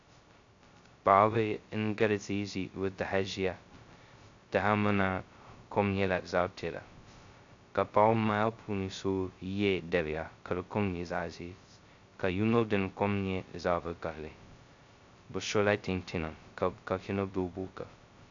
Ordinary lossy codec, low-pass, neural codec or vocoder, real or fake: AAC, 64 kbps; 7.2 kHz; codec, 16 kHz, 0.2 kbps, FocalCodec; fake